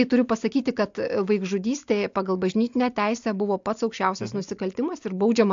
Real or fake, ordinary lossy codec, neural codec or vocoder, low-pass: real; AAC, 64 kbps; none; 7.2 kHz